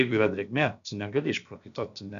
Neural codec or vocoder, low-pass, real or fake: codec, 16 kHz, about 1 kbps, DyCAST, with the encoder's durations; 7.2 kHz; fake